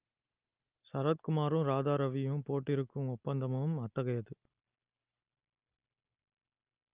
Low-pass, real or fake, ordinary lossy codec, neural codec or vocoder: 3.6 kHz; real; Opus, 24 kbps; none